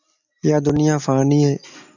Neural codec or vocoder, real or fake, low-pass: none; real; 7.2 kHz